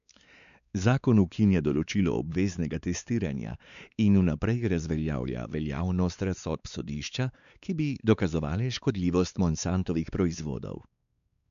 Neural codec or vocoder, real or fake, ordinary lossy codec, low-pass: codec, 16 kHz, 4 kbps, X-Codec, WavLM features, trained on Multilingual LibriSpeech; fake; none; 7.2 kHz